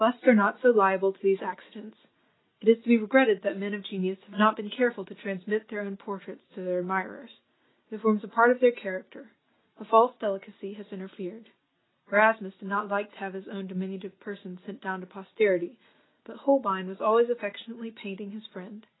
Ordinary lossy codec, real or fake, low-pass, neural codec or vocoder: AAC, 16 kbps; real; 7.2 kHz; none